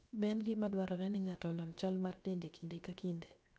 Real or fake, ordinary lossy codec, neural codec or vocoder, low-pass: fake; none; codec, 16 kHz, 0.7 kbps, FocalCodec; none